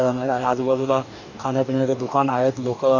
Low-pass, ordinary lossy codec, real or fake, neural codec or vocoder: 7.2 kHz; none; fake; codec, 44.1 kHz, 2.6 kbps, DAC